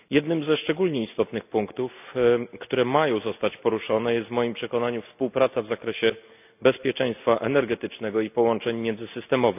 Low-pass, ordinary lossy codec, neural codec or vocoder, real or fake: 3.6 kHz; none; none; real